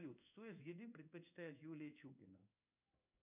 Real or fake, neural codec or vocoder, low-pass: fake; codec, 16 kHz in and 24 kHz out, 1 kbps, XY-Tokenizer; 3.6 kHz